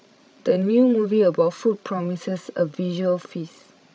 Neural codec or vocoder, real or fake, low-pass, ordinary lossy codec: codec, 16 kHz, 16 kbps, FreqCodec, larger model; fake; none; none